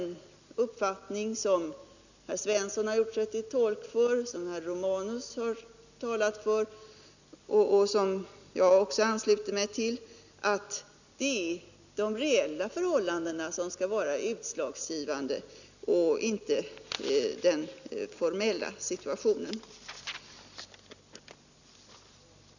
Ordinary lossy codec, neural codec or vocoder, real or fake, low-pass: none; none; real; 7.2 kHz